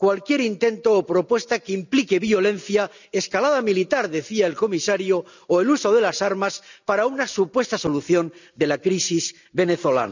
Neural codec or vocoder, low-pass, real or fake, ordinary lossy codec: none; 7.2 kHz; real; none